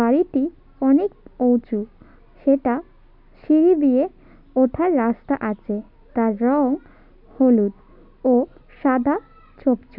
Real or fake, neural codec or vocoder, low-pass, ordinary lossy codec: real; none; 5.4 kHz; none